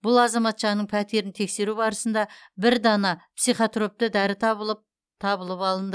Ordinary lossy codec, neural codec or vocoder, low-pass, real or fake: none; none; none; real